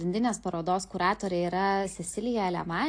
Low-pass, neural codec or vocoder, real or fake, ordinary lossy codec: 9.9 kHz; none; real; AAC, 48 kbps